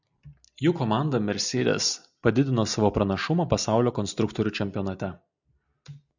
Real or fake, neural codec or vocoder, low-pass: real; none; 7.2 kHz